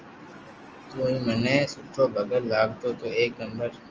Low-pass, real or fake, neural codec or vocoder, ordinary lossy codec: 7.2 kHz; real; none; Opus, 24 kbps